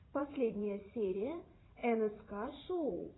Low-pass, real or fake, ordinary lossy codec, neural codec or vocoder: 7.2 kHz; fake; AAC, 16 kbps; vocoder, 44.1 kHz, 80 mel bands, Vocos